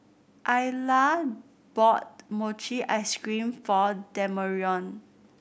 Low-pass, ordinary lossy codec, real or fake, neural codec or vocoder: none; none; real; none